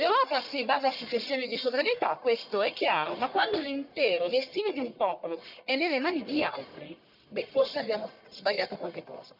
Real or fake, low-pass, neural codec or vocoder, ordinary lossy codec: fake; 5.4 kHz; codec, 44.1 kHz, 1.7 kbps, Pupu-Codec; none